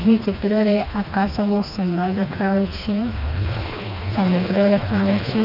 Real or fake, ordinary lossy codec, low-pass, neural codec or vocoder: fake; none; 5.4 kHz; codec, 16 kHz, 2 kbps, FreqCodec, smaller model